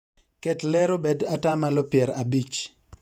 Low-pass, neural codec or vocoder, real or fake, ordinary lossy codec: 19.8 kHz; vocoder, 48 kHz, 128 mel bands, Vocos; fake; none